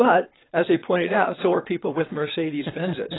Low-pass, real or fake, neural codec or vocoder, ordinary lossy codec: 7.2 kHz; fake; vocoder, 22.05 kHz, 80 mel bands, WaveNeXt; AAC, 16 kbps